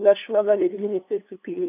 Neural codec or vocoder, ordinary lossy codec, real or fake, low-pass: codec, 16 kHz, 2 kbps, FunCodec, trained on LibriTTS, 25 frames a second; none; fake; 3.6 kHz